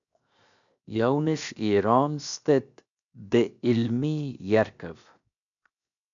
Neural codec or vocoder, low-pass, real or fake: codec, 16 kHz, 0.7 kbps, FocalCodec; 7.2 kHz; fake